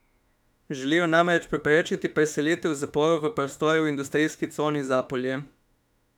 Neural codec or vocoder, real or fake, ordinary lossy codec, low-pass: autoencoder, 48 kHz, 32 numbers a frame, DAC-VAE, trained on Japanese speech; fake; none; 19.8 kHz